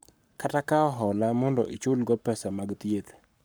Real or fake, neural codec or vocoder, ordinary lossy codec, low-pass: fake; codec, 44.1 kHz, 7.8 kbps, Pupu-Codec; none; none